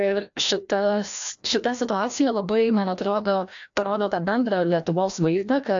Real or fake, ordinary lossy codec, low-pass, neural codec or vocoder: fake; AAC, 64 kbps; 7.2 kHz; codec, 16 kHz, 1 kbps, FreqCodec, larger model